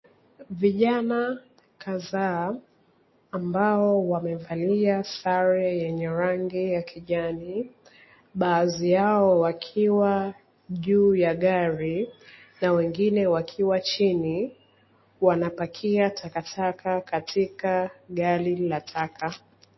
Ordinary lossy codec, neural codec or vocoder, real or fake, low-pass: MP3, 24 kbps; none; real; 7.2 kHz